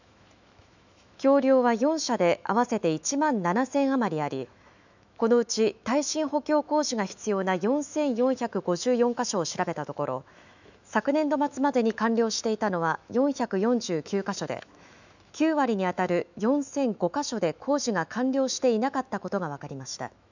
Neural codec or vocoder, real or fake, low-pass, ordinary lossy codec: autoencoder, 48 kHz, 128 numbers a frame, DAC-VAE, trained on Japanese speech; fake; 7.2 kHz; none